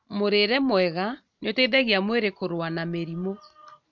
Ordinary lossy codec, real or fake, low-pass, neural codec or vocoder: none; real; 7.2 kHz; none